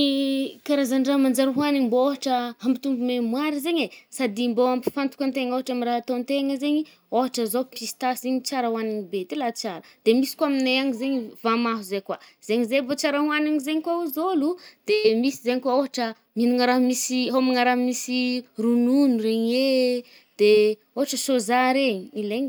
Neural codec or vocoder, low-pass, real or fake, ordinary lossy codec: none; none; real; none